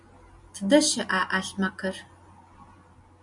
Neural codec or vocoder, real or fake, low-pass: none; real; 10.8 kHz